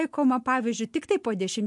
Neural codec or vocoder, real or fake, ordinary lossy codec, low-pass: none; real; MP3, 64 kbps; 10.8 kHz